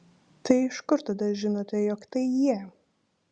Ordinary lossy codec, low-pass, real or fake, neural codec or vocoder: Opus, 64 kbps; 9.9 kHz; real; none